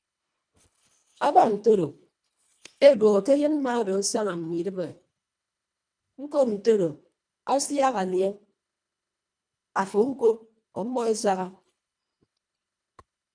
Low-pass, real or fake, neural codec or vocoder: 9.9 kHz; fake; codec, 24 kHz, 1.5 kbps, HILCodec